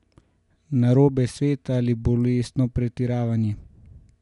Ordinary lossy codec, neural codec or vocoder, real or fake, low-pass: none; none; real; 10.8 kHz